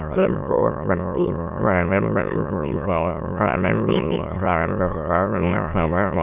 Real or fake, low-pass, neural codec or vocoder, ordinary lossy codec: fake; 3.6 kHz; autoencoder, 22.05 kHz, a latent of 192 numbers a frame, VITS, trained on many speakers; none